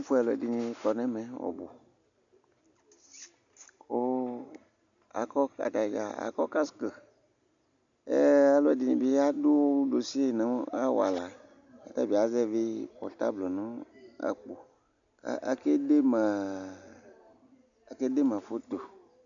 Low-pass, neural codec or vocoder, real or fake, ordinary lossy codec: 7.2 kHz; none; real; MP3, 96 kbps